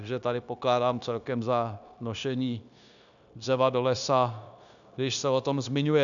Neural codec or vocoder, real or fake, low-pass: codec, 16 kHz, 0.9 kbps, LongCat-Audio-Codec; fake; 7.2 kHz